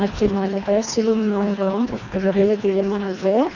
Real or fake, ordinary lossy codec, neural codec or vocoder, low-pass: fake; none; codec, 24 kHz, 1.5 kbps, HILCodec; 7.2 kHz